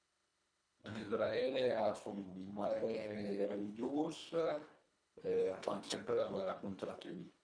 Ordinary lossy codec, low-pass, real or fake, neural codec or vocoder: none; 9.9 kHz; fake; codec, 24 kHz, 1.5 kbps, HILCodec